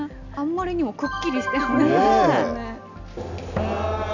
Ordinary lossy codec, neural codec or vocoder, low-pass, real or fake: none; none; 7.2 kHz; real